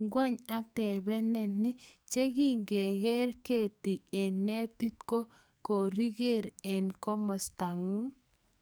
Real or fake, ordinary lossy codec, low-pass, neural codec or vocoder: fake; none; none; codec, 44.1 kHz, 2.6 kbps, SNAC